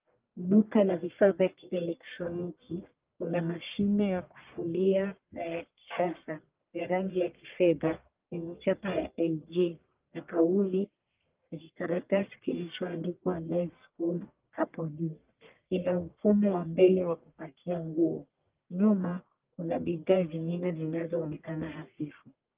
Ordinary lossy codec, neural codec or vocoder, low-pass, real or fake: Opus, 32 kbps; codec, 44.1 kHz, 1.7 kbps, Pupu-Codec; 3.6 kHz; fake